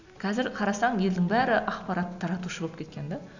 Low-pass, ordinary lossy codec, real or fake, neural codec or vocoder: 7.2 kHz; none; real; none